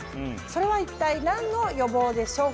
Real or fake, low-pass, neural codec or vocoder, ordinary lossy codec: real; none; none; none